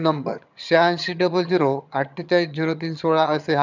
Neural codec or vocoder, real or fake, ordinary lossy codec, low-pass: vocoder, 22.05 kHz, 80 mel bands, HiFi-GAN; fake; none; 7.2 kHz